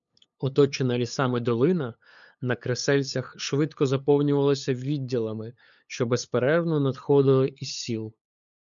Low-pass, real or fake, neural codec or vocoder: 7.2 kHz; fake; codec, 16 kHz, 8 kbps, FunCodec, trained on LibriTTS, 25 frames a second